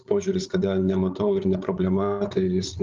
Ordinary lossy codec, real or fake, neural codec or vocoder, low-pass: Opus, 32 kbps; fake; codec, 16 kHz, 16 kbps, FreqCodec, larger model; 7.2 kHz